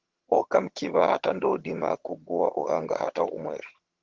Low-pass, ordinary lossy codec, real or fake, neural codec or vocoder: 7.2 kHz; Opus, 16 kbps; fake; vocoder, 22.05 kHz, 80 mel bands, HiFi-GAN